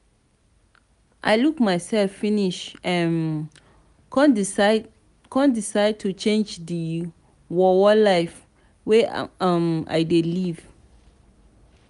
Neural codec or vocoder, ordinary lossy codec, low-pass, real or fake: none; none; 10.8 kHz; real